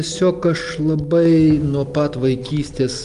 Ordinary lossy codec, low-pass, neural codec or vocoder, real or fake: Opus, 32 kbps; 10.8 kHz; none; real